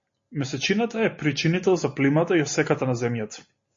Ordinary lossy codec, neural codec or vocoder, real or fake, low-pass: MP3, 32 kbps; none; real; 7.2 kHz